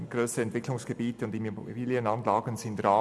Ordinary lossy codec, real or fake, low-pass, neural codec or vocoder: none; real; none; none